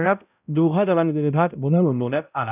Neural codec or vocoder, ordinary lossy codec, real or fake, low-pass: codec, 16 kHz, 0.5 kbps, X-Codec, HuBERT features, trained on balanced general audio; none; fake; 3.6 kHz